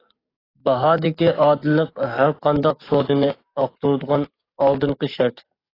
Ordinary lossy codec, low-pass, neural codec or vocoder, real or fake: AAC, 24 kbps; 5.4 kHz; codec, 44.1 kHz, 7.8 kbps, DAC; fake